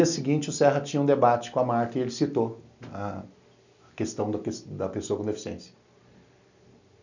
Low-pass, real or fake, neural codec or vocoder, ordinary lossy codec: 7.2 kHz; real; none; none